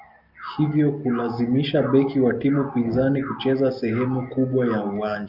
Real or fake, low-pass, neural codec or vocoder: real; 5.4 kHz; none